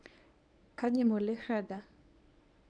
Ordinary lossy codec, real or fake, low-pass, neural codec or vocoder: none; fake; 9.9 kHz; codec, 24 kHz, 0.9 kbps, WavTokenizer, medium speech release version 1